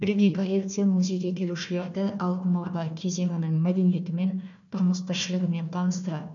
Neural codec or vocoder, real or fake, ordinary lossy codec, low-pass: codec, 16 kHz, 1 kbps, FunCodec, trained on Chinese and English, 50 frames a second; fake; none; 7.2 kHz